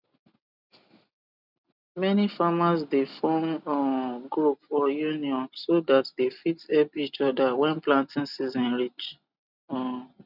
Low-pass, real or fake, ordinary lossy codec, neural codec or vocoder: 5.4 kHz; real; none; none